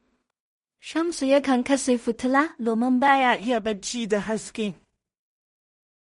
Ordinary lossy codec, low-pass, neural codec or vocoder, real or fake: MP3, 48 kbps; 10.8 kHz; codec, 16 kHz in and 24 kHz out, 0.4 kbps, LongCat-Audio-Codec, two codebook decoder; fake